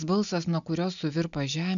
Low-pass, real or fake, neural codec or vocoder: 7.2 kHz; real; none